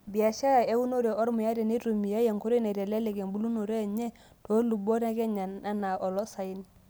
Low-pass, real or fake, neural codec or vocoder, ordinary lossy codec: none; real; none; none